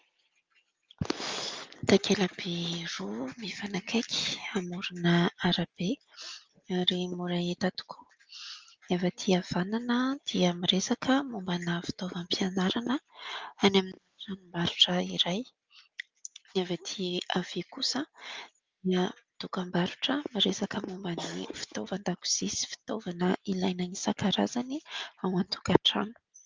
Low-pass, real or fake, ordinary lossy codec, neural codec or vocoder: 7.2 kHz; real; Opus, 24 kbps; none